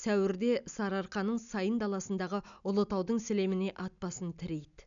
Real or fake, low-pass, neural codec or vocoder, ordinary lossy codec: real; 7.2 kHz; none; none